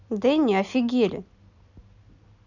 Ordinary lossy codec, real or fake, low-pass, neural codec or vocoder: none; real; 7.2 kHz; none